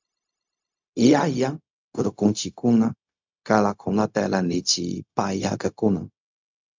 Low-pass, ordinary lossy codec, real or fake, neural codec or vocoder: 7.2 kHz; MP3, 64 kbps; fake; codec, 16 kHz, 0.4 kbps, LongCat-Audio-Codec